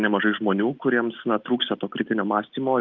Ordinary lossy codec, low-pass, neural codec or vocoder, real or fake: Opus, 24 kbps; 7.2 kHz; none; real